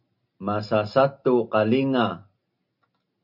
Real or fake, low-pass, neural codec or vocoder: real; 5.4 kHz; none